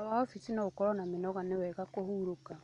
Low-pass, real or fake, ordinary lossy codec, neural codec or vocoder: 10.8 kHz; real; none; none